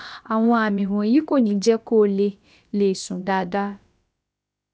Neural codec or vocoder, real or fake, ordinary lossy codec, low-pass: codec, 16 kHz, about 1 kbps, DyCAST, with the encoder's durations; fake; none; none